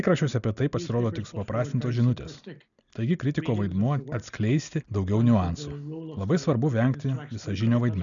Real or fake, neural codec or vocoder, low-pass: real; none; 7.2 kHz